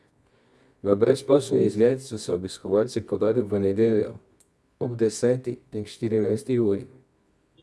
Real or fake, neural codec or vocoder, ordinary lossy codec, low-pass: fake; codec, 24 kHz, 0.9 kbps, WavTokenizer, medium music audio release; none; none